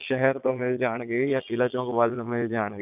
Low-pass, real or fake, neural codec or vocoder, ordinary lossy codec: 3.6 kHz; fake; vocoder, 22.05 kHz, 80 mel bands, Vocos; none